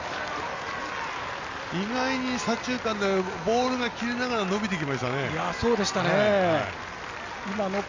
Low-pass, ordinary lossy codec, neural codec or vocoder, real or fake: 7.2 kHz; MP3, 64 kbps; none; real